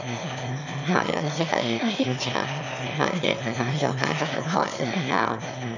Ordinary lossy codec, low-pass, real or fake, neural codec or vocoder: none; 7.2 kHz; fake; autoencoder, 22.05 kHz, a latent of 192 numbers a frame, VITS, trained on one speaker